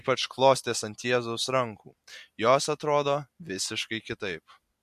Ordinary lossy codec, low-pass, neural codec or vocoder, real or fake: MP3, 64 kbps; 14.4 kHz; none; real